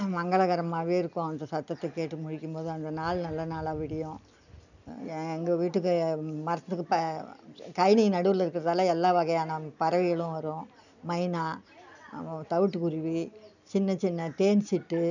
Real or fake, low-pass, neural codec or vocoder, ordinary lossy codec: real; 7.2 kHz; none; none